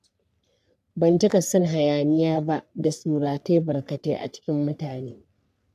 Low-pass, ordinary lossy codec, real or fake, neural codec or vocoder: 14.4 kHz; none; fake; codec, 44.1 kHz, 3.4 kbps, Pupu-Codec